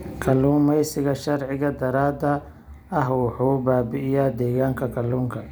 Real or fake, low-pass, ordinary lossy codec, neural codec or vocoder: fake; none; none; vocoder, 44.1 kHz, 128 mel bands every 256 samples, BigVGAN v2